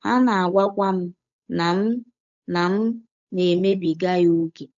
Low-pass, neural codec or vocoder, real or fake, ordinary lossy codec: 7.2 kHz; codec, 16 kHz, 8 kbps, FunCodec, trained on Chinese and English, 25 frames a second; fake; AAC, 64 kbps